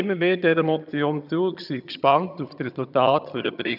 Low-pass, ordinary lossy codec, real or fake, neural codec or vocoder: 5.4 kHz; none; fake; vocoder, 22.05 kHz, 80 mel bands, HiFi-GAN